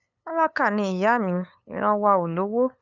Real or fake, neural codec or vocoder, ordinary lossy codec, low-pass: fake; codec, 16 kHz, 8 kbps, FunCodec, trained on LibriTTS, 25 frames a second; none; 7.2 kHz